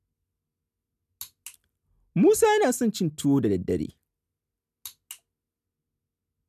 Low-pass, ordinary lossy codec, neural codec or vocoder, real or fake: 14.4 kHz; none; none; real